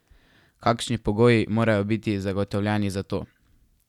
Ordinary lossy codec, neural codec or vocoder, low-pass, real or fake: none; none; 19.8 kHz; real